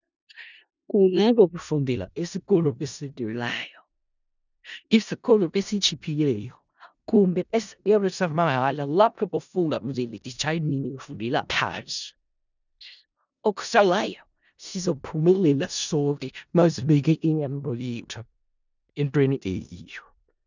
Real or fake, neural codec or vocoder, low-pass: fake; codec, 16 kHz in and 24 kHz out, 0.4 kbps, LongCat-Audio-Codec, four codebook decoder; 7.2 kHz